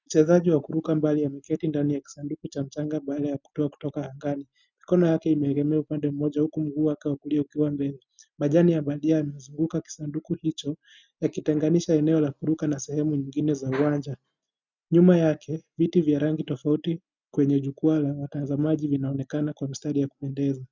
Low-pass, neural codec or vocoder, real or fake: 7.2 kHz; none; real